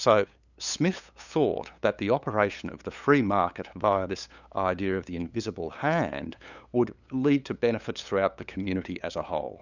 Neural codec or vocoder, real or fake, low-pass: codec, 16 kHz, 8 kbps, FunCodec, trained on LibriTTS, 25 frames a second; fake; 7.2 kHz